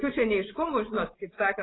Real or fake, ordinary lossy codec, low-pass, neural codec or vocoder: fake; AAC, 16 kbps; 7.2 kHz; vocoder, 24 kHz, 100 mel bands, Vocos